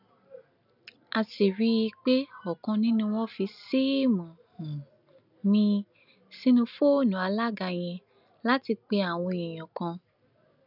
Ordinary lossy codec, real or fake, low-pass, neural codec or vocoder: none; real; 5.4 kHz; none